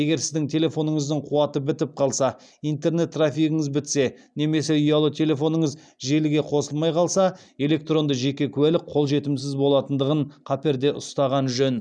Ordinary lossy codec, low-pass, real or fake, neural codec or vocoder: none; 9.9 kHz; real; none